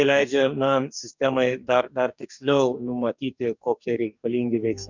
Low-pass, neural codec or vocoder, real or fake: 7.2 kHz; codec, 44.1 kHz, 2.6 kbps, DAC; fake